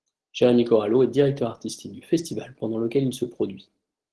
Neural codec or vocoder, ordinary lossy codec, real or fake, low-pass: none; Opus, 16 kbps; real; 10.8 kHz